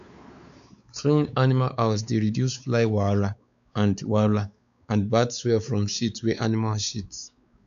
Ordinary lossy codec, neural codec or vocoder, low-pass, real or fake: none; codec, 16 kHz, 4 kbps, X-Codec, WavLM features, trained on Multilingual LibriSpeech; 7.2 kHz; fake